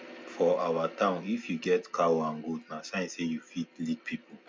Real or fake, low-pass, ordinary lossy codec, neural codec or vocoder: real; none; none; none